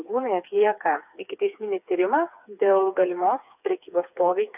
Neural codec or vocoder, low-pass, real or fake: codec, 16 kHz, 4 kbps, FreqCodec, smaller model; 3.6 kHz; fake